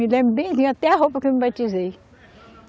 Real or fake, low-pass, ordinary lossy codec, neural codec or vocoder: real; none; none; none